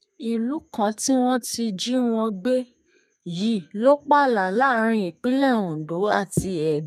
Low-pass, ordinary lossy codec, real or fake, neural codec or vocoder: 14.4 kHz; none; fake; codec, 32 kHz, 1.9 kbps, SNAC